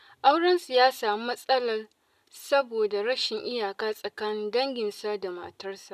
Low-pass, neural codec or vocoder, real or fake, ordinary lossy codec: 14.4 kHz; none; real; none